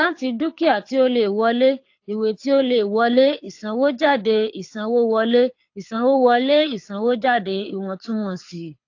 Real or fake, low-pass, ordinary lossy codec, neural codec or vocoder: fake; 7.2 kHz; AAC, 48 kbps; codec, 24 kHz, 6 kbps, HILCodec